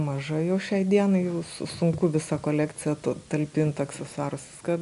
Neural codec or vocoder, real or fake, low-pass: none; real; 10.8 kHz